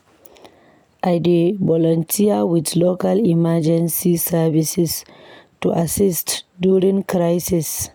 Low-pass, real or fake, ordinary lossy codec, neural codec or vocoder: none; real; none; none